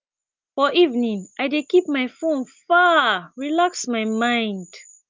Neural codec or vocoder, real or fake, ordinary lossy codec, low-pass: none; real; Opus, 24 kbps; 7.2 kHz